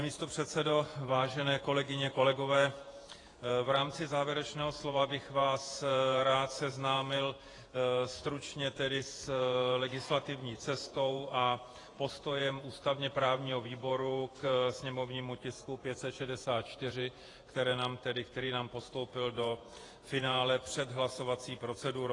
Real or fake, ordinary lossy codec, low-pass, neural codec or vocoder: fake; AAC, 32 kbps; 10.8 kHz; vocoder, 48 kHz, 128 mel bands, Vocos